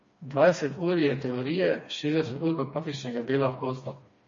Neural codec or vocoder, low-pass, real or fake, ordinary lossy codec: codec, 16 kHz, 2 kbps, FreqCodec, smaller model; 7.2 kHz; fake; MP3, 32 kbps